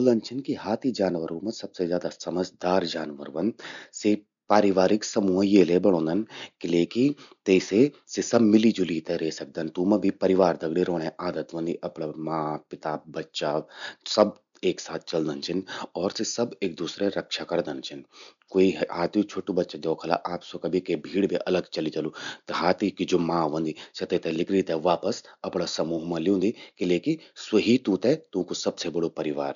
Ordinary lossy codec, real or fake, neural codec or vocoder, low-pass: none; real; none; 7.2 kHz